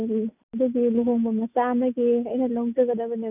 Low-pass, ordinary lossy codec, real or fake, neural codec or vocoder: 3.6 kHz; none; real; none